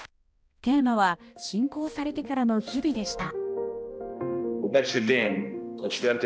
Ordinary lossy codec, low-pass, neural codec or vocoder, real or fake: none; none; codec, 16 kHz, 1 kbps, X-Codec, HuBERT features, trained on balanced general audio; fake